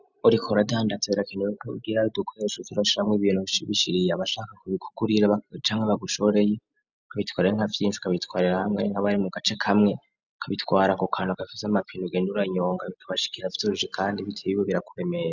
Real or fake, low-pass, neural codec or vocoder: real; 7.2 kHz; none